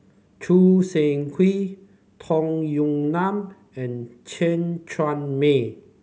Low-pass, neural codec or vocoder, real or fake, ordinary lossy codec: none; none; real; none